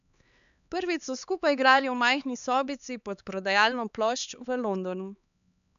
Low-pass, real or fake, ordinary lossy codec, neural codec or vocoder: 7.2 kHz; fake; none; codec, 16 kHz, 4 kbps, X-Codec, HuBERT features, trained on LibriSpeech